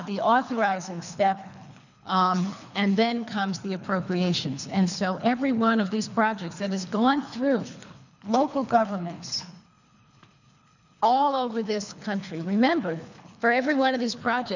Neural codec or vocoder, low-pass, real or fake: codec, 24 kHz, 3 kbps, HILCodec; 7.2 kHz; fake